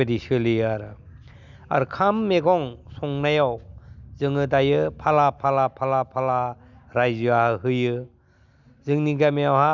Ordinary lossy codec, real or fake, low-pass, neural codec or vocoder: none; real; 7.2 kHz; none